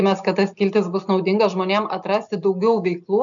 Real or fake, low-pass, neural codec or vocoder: real; 7.2 kHz; none